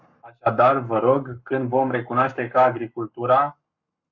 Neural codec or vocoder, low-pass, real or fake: codec, 44.1 kHz, 7.8 kbps, Pupu-Codec; 7.2 kHz; fake